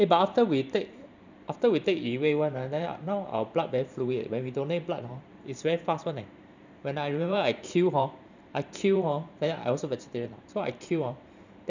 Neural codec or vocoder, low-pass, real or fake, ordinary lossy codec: vocoder, 44.1 kHz, 128 mel bands every 512 samples, BigVGAN v2; 7.2 kHz; fake; none